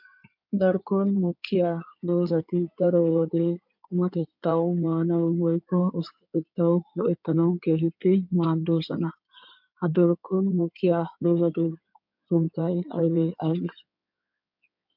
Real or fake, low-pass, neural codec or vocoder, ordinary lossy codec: fake; 5.4 kHz; codec, 16 kHz in and 24 kHz out, 2.2 kbps, FireRedTTS-2 codec; MP3, 48 kbps